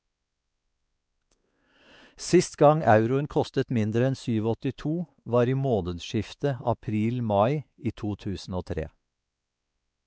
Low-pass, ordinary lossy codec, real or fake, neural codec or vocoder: none; none; fake; codec, 16 kHz, 4 kbps, X-Codec, WavLM features, trained on Multilingual LibriSpeech